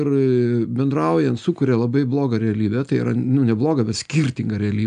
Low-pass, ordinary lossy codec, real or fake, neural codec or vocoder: 9.9 kHz; AAC, 96 kbps; real; none